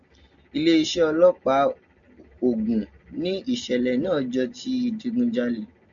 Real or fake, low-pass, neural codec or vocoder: real; 7.2 kHz; none